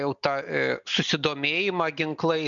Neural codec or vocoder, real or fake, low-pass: none; real; 7.2 kHz